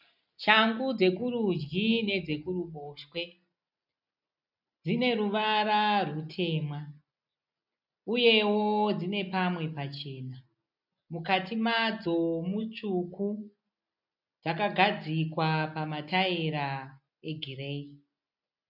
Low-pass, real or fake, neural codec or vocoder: 5.4 kHz; real; none